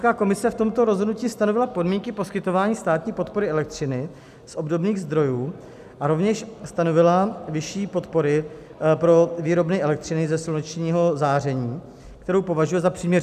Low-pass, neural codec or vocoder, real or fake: 14.4 kHz; none; real